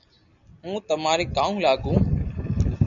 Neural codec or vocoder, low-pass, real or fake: none; 7.2 kHz; real